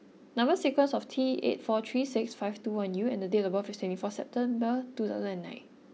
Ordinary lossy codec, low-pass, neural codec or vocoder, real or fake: none; none; none; real